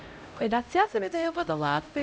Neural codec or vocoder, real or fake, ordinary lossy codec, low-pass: codec, 16 kHz, 0.5 kbps, X-Codec, HuBERT features, trained on LibriSpeech; fake; none; none